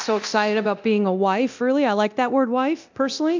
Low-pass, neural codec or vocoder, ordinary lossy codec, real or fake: 7.2 kHz; codec, 24 kHz, 0.9 kbps, DualCodec; MP3, 64 kbps; fake